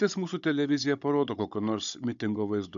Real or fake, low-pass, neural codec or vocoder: fake; 7.2 kHz; codec, 16 kHz, 16 kbps, FunCodec, trained on Chinese and English, 50 frames a second